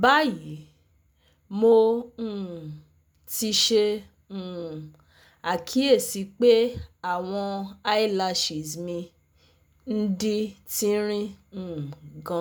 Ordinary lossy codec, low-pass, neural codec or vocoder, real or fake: none; none; none; real